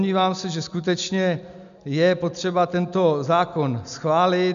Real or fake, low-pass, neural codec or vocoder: real; 7.2 kHz; none